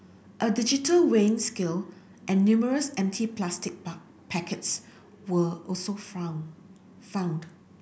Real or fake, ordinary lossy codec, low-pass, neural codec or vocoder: real; none; none; none